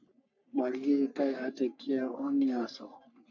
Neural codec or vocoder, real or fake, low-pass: codec, 44.1 kHz, 3.4 kbps, Pupu-Codec; fake; 7.2 kHz